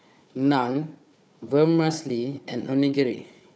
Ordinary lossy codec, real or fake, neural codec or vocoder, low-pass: none; fake; codec, 16 kHz, 4 kbps, FunCodec, trained on Chinese and English, 50 frames a second; none